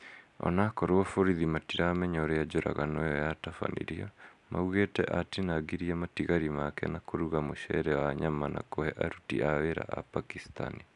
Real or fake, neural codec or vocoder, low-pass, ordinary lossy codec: real; none; 10.8 kHz; none